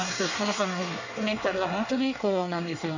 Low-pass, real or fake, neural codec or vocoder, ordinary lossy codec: 7.2 kHz; fake; codec, 24 kHz, 1 kbps, SNAC; none